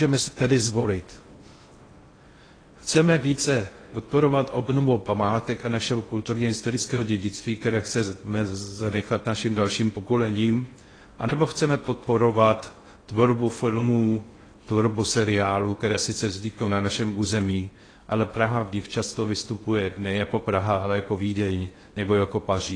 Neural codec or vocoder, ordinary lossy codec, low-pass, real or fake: codec, 16 kHz in and 24 kHz out, 0.6 kbps, FocalCodec, streaming, 2048 codes; AAC, 32 kbps; 9.9 kHz; fake